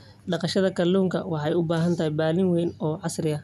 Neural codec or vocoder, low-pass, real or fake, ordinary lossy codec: none; 14.4 kHz; real; none